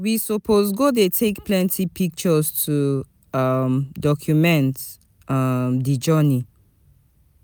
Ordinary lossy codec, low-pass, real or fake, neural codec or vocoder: none; none; real; none